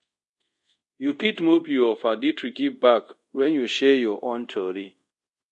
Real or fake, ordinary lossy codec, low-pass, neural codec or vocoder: fake; MP3, 48 kbps; 10.8 kHz; codec, 24 kHz, 0.5 kbps, DualCodec